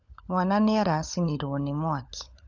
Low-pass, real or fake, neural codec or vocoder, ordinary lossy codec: 7.2 kHz; fake; codec, 16 kHz, 16 kbps, FunCodec, trained on LibriTTS, 50 frames a second; none